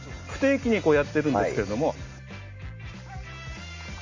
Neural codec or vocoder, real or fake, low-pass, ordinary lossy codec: none; real; 7.2 kHz; MP3, 48 kbps